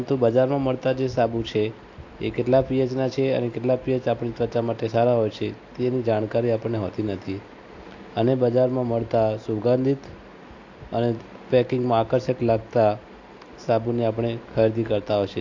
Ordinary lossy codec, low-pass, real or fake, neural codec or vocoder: AAC, 48 kbps; 7.2 kHz; real; none